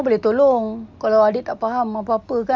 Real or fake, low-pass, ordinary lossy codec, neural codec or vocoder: real; none; none; none